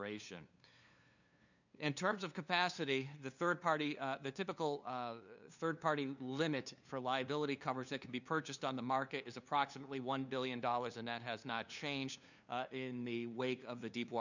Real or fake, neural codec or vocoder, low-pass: fake; codec, 16 kHz, 2 kbps, FunCodec, trained on LibriTTS, 25 frames a second; 7.2 kHz